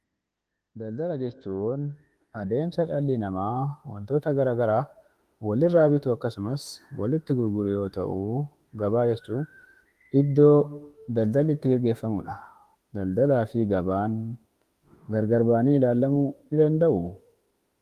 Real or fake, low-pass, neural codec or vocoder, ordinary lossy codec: fake; 14.4 kHz; autoencoder, 48 kHz, 32 numbers a frame, DAC-VAE, trained on Japanese speech; Opus, 24 kbps